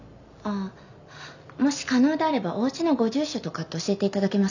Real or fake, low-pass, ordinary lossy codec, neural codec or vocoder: real; 7.2 kHz; none; none